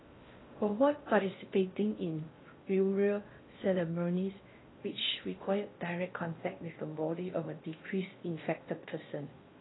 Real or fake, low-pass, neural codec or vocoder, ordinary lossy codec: fake; 7.2 kHz; codec, 16 kHz in and 24 kHz out, 0.6 kbps, FocalCodec, streaming, 4096 codes; AAC, 16 kbps